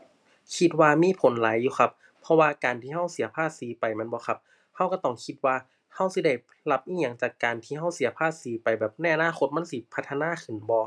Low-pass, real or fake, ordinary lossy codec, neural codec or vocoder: none; real; none; none